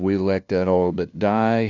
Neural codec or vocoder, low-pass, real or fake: codec, 16 kHz, 0.5 kbps, FunCodec, trained on LibriTTS, 25 frames a second; 7.2 kHz; fake